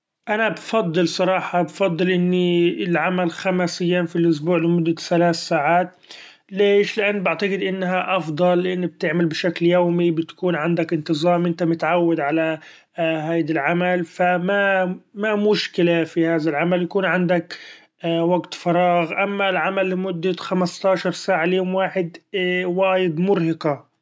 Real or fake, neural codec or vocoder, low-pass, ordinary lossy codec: real; none; none; none